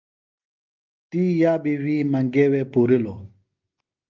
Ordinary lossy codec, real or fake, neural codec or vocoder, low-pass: Opus, 32 kbps; real; none; 7.2 kHz